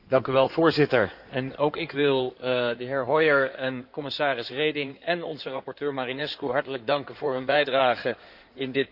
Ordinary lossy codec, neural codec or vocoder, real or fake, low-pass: none; codec, 16 kHz in and 24 kHz out, 2.2 kbps, FireRedTTS-2 codec; fake; 5.4 kHz